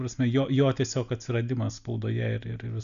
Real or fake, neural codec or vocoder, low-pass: real; none; 7.2 kHz